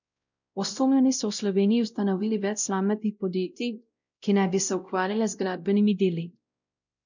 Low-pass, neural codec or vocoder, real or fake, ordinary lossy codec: 7.2 kHz; codec, 16 kHz, 0.5 kbps, X-Codec, WavLM features, trained on Multilingual LibriSpeech; fake; none